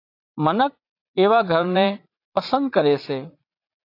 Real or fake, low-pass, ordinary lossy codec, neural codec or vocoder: fake; 5.4 kHz; AAC, 32 kbps; vocoder, 44.1 kHz, 80 mel bands, Vocos